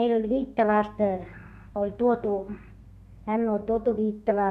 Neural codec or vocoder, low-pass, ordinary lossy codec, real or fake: codec, 32 kHz, 1.9 kbps, SNAC; 14.4 kHz; AAC, 96 kbps; fake